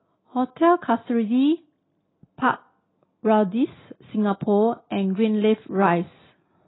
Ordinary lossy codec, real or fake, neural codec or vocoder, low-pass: AAC, 16 kbps; real; none; 7.2 kHz